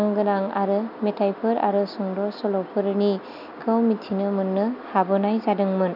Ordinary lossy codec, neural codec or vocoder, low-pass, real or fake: none; none; 5.4 kHz; real